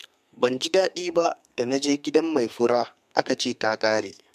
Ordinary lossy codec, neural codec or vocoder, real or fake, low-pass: MP3, 96 kbps; codec, 32 kHz, 1.9 kbps, SNAC; fake; 14.4 kHz